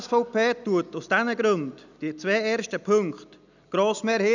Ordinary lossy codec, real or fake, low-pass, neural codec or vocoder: none; real; 7.2 kHz; none